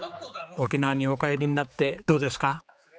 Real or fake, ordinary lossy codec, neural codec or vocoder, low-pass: fake; none; codec, 16 kHz, 4 kbps, X-Codec, HuBERT features, trained on general audio; none